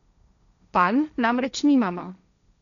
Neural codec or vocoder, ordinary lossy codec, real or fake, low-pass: codec, 16 kHz, 1.1 kbps, Voila-Tokenizer; none; fake; 7.2 kHz